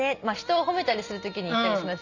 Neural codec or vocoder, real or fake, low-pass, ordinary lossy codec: none; real; 7.2 kHz; none